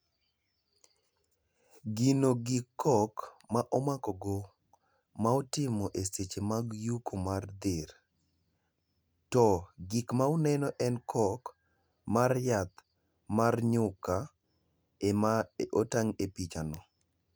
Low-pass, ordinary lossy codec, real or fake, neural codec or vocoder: none; none; real; none